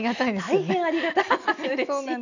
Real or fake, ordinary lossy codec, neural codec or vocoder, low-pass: real; none; none; 7.2 kHz